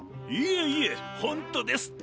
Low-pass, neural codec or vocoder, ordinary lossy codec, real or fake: none; none; none; real